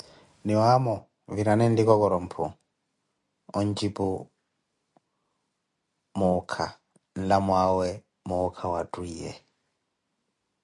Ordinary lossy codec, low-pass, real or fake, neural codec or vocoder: MP3, 64 kbps; 10.8 kHz; real; none